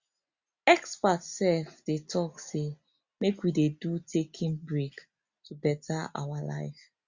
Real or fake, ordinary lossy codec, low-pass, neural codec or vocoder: real; Opus, 64 kbps; 7.2 kHz; none